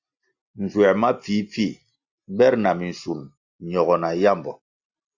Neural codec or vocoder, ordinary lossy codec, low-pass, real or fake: none; Opus, 64 kbps; 7.2 kHz; real